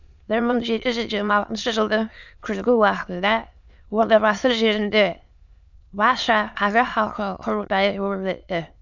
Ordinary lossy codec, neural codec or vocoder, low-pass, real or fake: none; autoencoder, 22.05 kHz, a latent of 192 numbers a frame, VITS, trained on many speakers; 7.2 kHz; fake